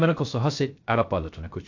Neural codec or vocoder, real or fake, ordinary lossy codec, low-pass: codec, 16 kHz, 0.3 kbps, FocalCodec; fake; AAC, 48 kbps; 7.2 kHz